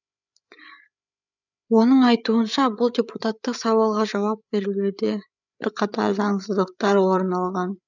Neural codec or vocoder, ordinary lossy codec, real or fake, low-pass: codec, 16 kHz, 8 kbps, FreqCodec, larger model; none; fake; 7.2 kHz